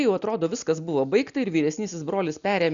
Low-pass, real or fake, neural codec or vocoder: 7.2 kHz; real; none